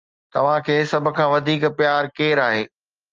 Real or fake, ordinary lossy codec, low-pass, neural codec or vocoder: real; Opus, 24 kbps; 7.2 kHz; none